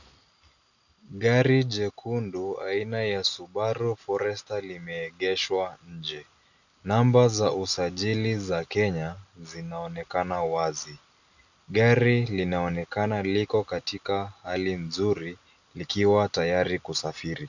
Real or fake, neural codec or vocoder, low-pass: real; none; 7.2 kHz